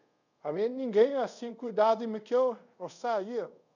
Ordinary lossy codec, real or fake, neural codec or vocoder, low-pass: none; fake; codec, 24 kHz, 0.5 kbps, DualCodec; 7.2 kHz